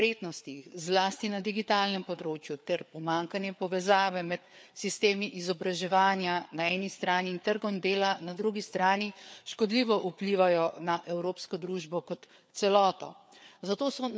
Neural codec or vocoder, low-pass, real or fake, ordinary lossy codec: codec, 16 kHz, 4 kbps, FreqCodec, larger model; none; fake; none